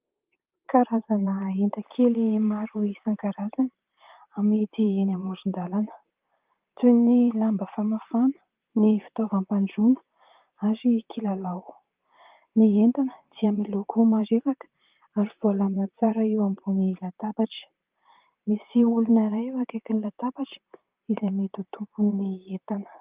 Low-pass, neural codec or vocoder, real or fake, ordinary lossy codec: 3.6 kHz; vocoder, 44.1 kHz, 128 mel bands, Pupu-Vocoder; fake; Opus, 32 kbps